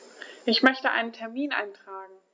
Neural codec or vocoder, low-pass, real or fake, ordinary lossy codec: none; none; real; none